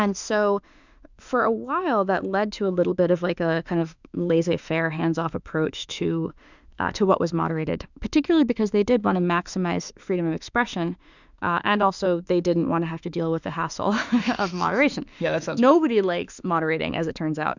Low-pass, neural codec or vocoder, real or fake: 7.2 kHz; autoencoder, 48 kHz, 32 numbers a frame, DAC-VAE, trained on Japanese speech; fake